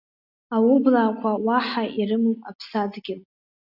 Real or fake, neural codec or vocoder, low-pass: real; none; 5.4 kHz